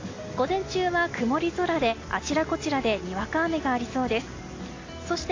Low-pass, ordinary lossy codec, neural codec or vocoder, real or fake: 7.2 kHz; AAC, 32 kbps; none; real